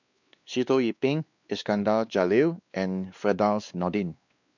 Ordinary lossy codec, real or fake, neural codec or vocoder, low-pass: none; fake; codec, 16 kHz, 2 kbps, X-Codec, WavLM features, trained on Multilingual LibriSpeech; 7.2 kHz